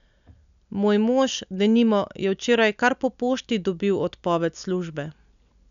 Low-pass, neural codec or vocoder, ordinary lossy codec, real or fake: 7.2 kHz; none; none; real